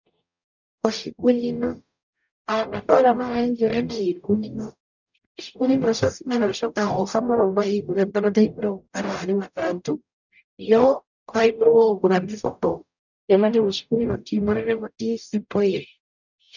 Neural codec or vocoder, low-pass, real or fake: codec, 44.1 kHz, 0.9 kbps, DAC; 7.2 kHz; fake